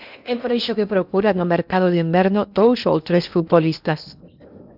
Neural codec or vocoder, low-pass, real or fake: codec, 16 kHz in and 24 kHz out, 0.8 kbps, FocalCodec, streaming, 65536 codes; 5.4 kHz; fake